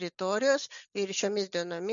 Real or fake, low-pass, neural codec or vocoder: real; 7.2 kHz; none